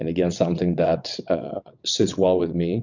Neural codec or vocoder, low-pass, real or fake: vocoder, 22.05 kHz, 80 mel bands, WaveNeXt; 7.2 kHz; fake